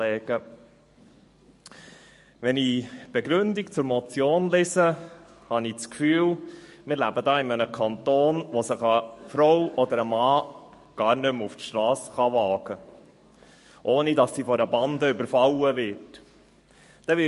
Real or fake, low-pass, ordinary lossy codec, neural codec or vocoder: fake; 14.4 kHz; MP3, 48 kbps; codec, 44.1 kHz, 7.8 kbps, DAC